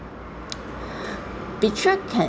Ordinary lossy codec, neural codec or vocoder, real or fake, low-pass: none; none; real; none